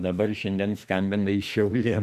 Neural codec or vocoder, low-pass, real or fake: autoencoder, 48 kHz, 32 numbers a frame, DAC-VAE, trained on Japanese speech; 14.4 kHz; fake